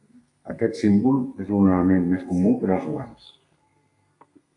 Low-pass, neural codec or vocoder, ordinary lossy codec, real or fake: 10.8 kHz; codec, 32 kHz, 1.9 kbps, SNAC; AAC, 64 kbps; fake